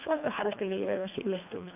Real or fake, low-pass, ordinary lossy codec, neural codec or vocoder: fake; 3.6 kHz; none; codec, 24 kHz, 1.5 kbps, HILCodec